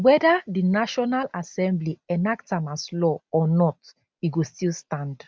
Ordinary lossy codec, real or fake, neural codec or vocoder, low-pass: none; real; none; none